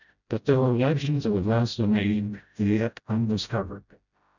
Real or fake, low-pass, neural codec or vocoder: fake; 7.2 kHz; codec, 16 kHz, 0.5 kbps, FreqCodec, smaller model